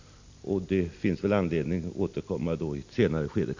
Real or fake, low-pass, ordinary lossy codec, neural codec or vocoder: real; 7.2 kHz; AAC, 48 kbps; none